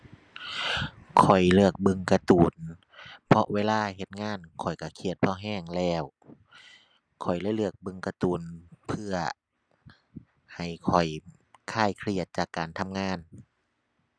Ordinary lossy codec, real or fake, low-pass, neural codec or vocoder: none; real; none; none